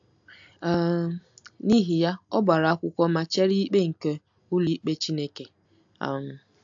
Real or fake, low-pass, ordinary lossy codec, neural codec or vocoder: real; 7.2 kHz; AAC, 64 kbps; none